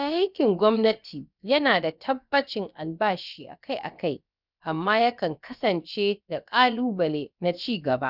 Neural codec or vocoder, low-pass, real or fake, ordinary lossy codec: codec, 16 kHz, about 1 kbps, DyCAST, with the encoder's durations; 5.4 kHz; fake; none